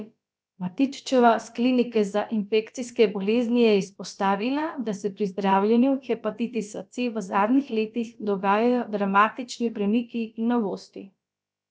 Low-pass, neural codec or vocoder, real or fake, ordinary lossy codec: none; codec, 16 kHz, about 1 kbps, DyCAST, with the encoder's durations; fake; none